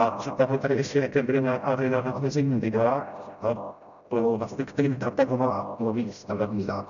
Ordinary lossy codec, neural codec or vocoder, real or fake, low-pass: MP3, 96 kbps; codec, 16 kHz, 0.5 kbps, FreqCodec, smaller model; fake; 7.2 kHz